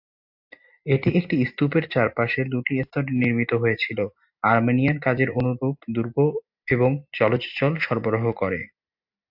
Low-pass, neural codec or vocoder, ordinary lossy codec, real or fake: 5.4 kHz; none; AAC, 48 kbps; real